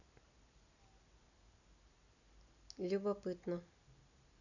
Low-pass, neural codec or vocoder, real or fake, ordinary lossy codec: 7.2 kHz; none; real; none